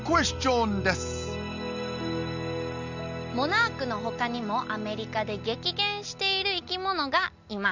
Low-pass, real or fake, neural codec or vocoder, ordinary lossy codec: 7.2 kHz; real; none; none